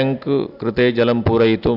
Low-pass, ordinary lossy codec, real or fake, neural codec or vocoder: 5.4 kHz; AAC, 48 kbps; real; none